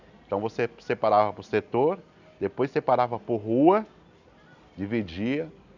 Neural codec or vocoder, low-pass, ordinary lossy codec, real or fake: none; 7.2 kHz; none; real